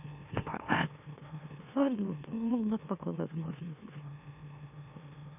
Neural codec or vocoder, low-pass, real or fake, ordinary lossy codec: autoencoder, 44.1 kHz, a latent of 192 numbers a frame, MeloTTS; 3.6 kHz; fake; none